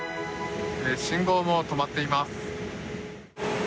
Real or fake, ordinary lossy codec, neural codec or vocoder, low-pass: real; none; none; none